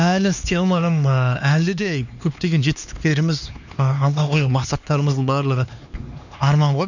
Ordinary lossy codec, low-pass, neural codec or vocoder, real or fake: none; 7.2 kHz; codec, 16 kHz, 2 kbps, X-Codec, HuBERT features, trained on LibriSpeech; fake